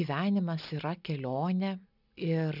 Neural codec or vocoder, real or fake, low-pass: none; real; 5.4 kHz